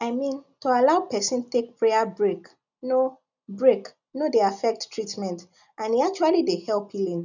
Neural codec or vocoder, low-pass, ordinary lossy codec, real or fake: none; 7.2 kHz; none; real